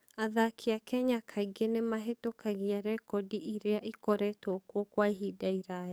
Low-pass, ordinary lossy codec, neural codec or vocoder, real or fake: none; none; codec, 44.1 kHz, 7.8 kbps, DAC; fake